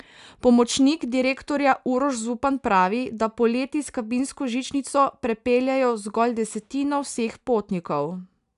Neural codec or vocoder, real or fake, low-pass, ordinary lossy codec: none; real; 10.8 kHz; none